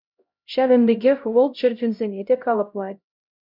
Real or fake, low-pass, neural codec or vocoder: fake; 5.4 kHz; codec, 16 kHz, 0.5 kbps, X-Codec, HuBERT features, trained on LibriSpeech